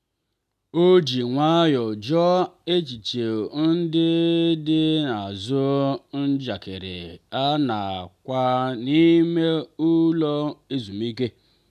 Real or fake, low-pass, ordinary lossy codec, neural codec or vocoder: real; 14.4 kHz; none; none